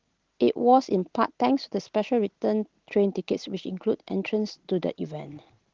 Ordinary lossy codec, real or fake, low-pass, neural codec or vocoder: Opus, 16 kbps; real; 7.2 kHz; none